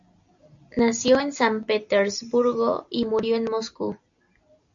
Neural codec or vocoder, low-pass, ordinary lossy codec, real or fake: none; 7.2 kHz; AAC, 64 kbps; real